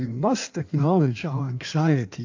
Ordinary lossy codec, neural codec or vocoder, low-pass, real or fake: AAC, 48 kbps; codec, 16 kHz in and 24 kHz out, 1.1 kbps, FireRedTTS-2 codec; 7.2 kHz; fake